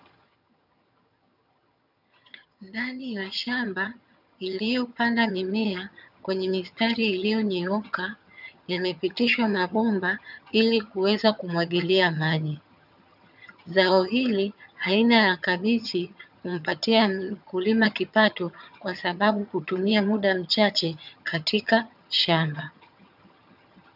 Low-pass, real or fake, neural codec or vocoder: 5.4 kHz; fake; vocoder, 22.05 kHz, 80 mel bands, HiFi-GAN